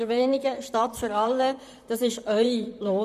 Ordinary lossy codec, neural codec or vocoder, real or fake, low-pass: none; vocoder, 44.1 kHz, 128 mel bands, Pupu-Vocoder; fake; 14.4 kHz